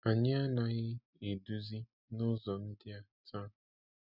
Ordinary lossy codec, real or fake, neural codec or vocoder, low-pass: none; real; none; 5.4 kHz